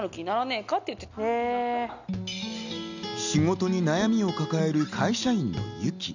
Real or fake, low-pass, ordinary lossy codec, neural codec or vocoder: real; 7.2 kHz; none; none